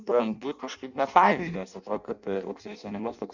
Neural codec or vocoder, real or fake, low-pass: codec, 16 kHz in and 24 kHz out, 0.6 kbps, FireRedTTS-2 codec; fake; 7.2 kHz